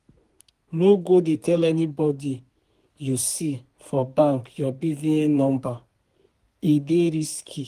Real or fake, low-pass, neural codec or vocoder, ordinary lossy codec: fake; 14.4 kHz; codec, 32 kHz, 1.9 kbps, SNAC; Opus, 24 kbps